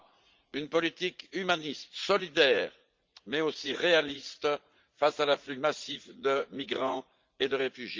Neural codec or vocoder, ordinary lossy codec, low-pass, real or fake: vocoder, 44.1 kHz, 80 mel bands, Vocos; Opus, 24 kbps; 7.2 kHz; fake